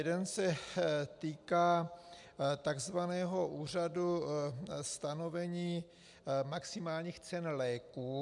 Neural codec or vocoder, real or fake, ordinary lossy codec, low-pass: none; real; MP3, 96 kbps; 10.8 kHz